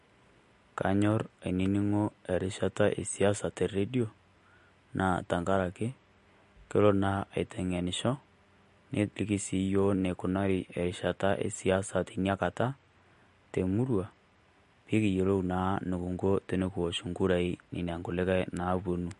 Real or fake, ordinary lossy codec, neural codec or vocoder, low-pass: real; MP3, 48 kbps; none; 14.4 kHz